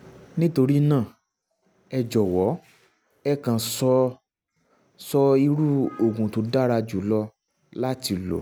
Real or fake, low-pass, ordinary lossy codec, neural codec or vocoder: real; none; none; none